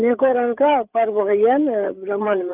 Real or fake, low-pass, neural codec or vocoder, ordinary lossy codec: fake; 3.6 kHz; codec, 16 kHz, 8 kbps, FreqCodec, larger model; Opus, 32 kbps